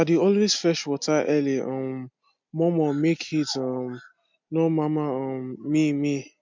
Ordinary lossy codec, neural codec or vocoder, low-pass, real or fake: MP3, 64 kbps; none; 7.2 kHz; real